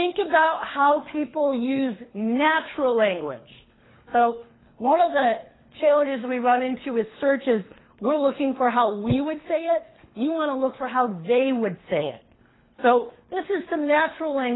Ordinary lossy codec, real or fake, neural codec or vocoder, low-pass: AAC, 16 kbps; fake; codec, 24 kHz, 3 kbps, HILCodec; 7.2 kHz